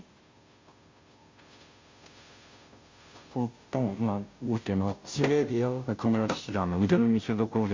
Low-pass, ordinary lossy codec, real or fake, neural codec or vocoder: 7.2 kHz; MP3, 48 kbps; fake; codec, 16 kHz, 0.5 kbps, FunCodec, trained on Chinese and English, 25 frames a second